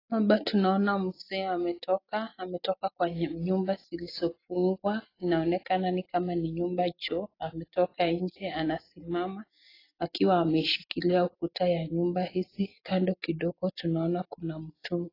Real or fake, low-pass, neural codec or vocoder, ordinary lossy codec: real; 5.4 kHz; none; AAC, 24 kbps